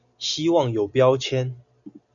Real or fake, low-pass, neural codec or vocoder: real; 7.2 kHz; none